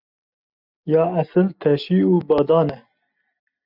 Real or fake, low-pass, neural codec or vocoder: real; 5.4 kHz; none